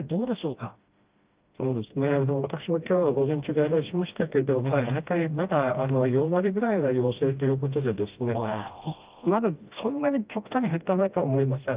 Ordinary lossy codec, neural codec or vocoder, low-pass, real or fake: Opus, 32 kbps; codec, 16 kHz, 1 kbps, FreqCodec, smaller model; 3.6 kHz; fake